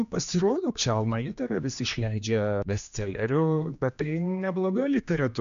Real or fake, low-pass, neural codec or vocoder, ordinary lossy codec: fake; 7.2 kHz; codec, 16 kHz, 2 kbps, X-Codec, HuBERT features, trained on general audio; MP3, 64 kbps